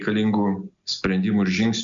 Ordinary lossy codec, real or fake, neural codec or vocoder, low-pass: MP3, 64 kbps; real; none; 7.2 kHz